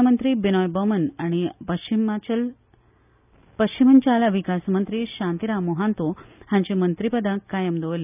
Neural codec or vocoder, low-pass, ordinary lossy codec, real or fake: none; 3.6 kHz; none; real